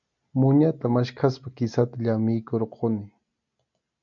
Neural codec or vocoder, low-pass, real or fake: none; 7.2 kHz; real